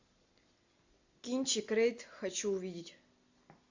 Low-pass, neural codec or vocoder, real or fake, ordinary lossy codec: 7.2 kHz; none; real; AAC, 48 kbps